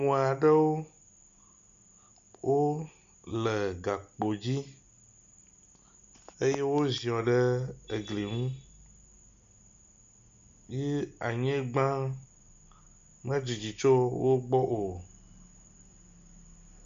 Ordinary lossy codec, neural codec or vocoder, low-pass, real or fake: MP3, 64 kbps; none; 7.2 kHz; real